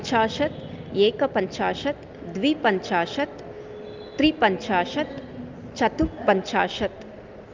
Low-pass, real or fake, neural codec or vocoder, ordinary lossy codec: 7.2 kHz; real; none; Opus, 24 kbps